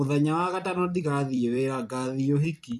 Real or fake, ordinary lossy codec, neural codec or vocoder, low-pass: fake; none; vocoder, 44.1 kHz, 128 mel bands every 256 samples, BigVGAN v2; 14.4 kHz